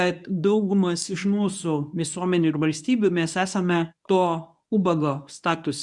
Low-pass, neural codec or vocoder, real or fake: 10.8 kHz; codec, 24 kHz, 0.9 kbps, WavTokenizer, medium speech release version 1; fake